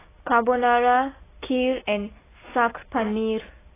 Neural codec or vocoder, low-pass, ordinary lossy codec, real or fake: none; 3.6 kHz; AAC, 16 kbps; real